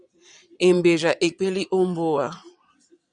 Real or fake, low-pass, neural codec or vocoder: fake; 9.9 kHz; vocoder, 22.05 kHz, 80 mel bands, Vocos